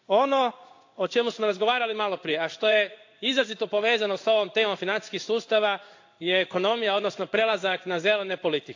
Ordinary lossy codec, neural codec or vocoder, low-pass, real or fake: AAC, 48 kbps; codec, 16 kHz in and 24 kHz out, 1 kbps, XY-Tokenizer; 7.2 kHz; fake